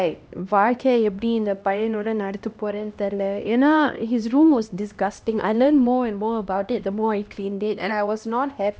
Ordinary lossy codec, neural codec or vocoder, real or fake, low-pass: none; codec, 16 kHz, 1 kbps, X-Codec, HuBERT features, trained on LibriSpeech; fake; none